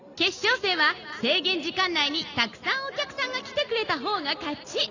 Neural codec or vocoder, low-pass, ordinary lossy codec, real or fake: none; 7.2 kHz; none; real